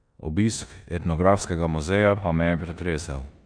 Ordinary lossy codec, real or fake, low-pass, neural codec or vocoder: none; fake; 9.9 kHz; codec, 16 kHz in and 24 kHz out, 0.9 kbps, LongCat-Audio-Codec, four codebook decoder